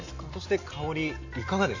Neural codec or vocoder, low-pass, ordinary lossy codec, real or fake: none; 7.2 kHz; AAC, 48 kbps; real